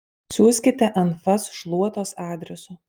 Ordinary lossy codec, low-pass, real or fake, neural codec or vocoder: Opus, 32 kbps; 19.8 kHz; real; none